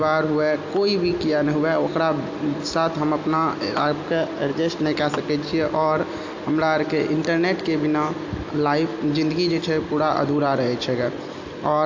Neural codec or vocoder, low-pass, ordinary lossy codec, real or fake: none; 7.2 kHz; AAC, 48 kbps; real